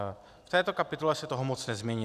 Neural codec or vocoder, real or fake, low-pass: none; real; 14.4 kHz